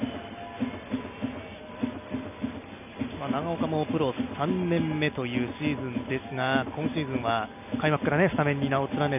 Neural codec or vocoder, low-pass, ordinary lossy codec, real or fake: none; 3.6 kHz; none; real